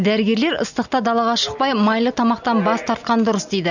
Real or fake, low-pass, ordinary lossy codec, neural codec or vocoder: real; 7.2 kHz; none; none